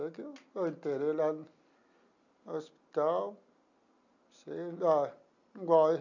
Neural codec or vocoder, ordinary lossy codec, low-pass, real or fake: none; none; 7.2 kHz; real